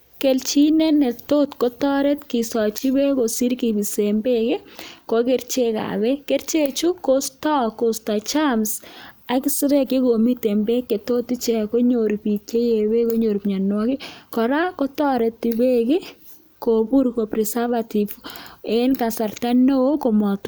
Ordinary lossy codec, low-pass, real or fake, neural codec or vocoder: none; none; real; none